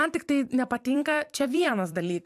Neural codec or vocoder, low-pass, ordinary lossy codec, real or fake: vocoder, 44.1 kHz, 128 mel bands every 256 samples, BigVGAN v2; 14.4 kHz; AAC, 96 kbps; fake